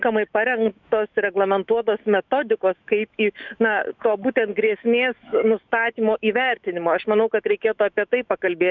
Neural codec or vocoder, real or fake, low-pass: autoencoder, 48 kHz, 128 numbers a frame, DAC-VAE, trained on Japanese speech; fake; 7.2 kHz